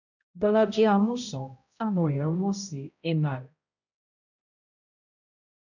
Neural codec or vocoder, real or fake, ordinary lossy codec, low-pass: codec, 16 kHz, 0.5 kbps, X-Codec, HuBERT features, trained on balanced general audio; fake; none; 7.2 kHz